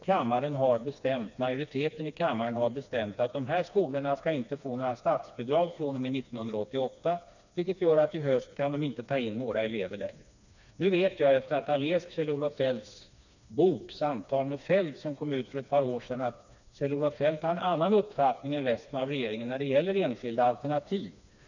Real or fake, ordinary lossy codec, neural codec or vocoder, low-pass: fake; none; codec, 16 kHz, 2 kbps, FreqCodec, smaller model; 7.2 kHz